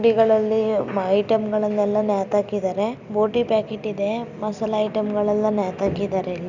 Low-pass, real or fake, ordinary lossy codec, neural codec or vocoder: 7.2 kHz; real; none; none